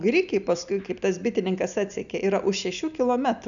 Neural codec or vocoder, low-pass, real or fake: none; 7.2 kHz; real